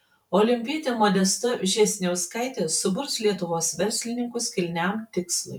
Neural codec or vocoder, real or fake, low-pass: vocoder, 44.1 kHz, 128 mel bands every 512 samples, BigVGAN v2; fake; 19.8 kHz